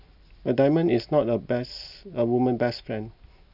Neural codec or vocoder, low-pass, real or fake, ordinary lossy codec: none; 5.4 kHz; real; none